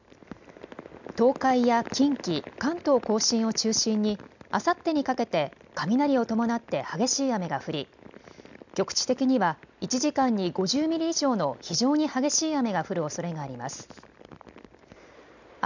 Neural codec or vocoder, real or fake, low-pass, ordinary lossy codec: none; real; 7.2 kHz; none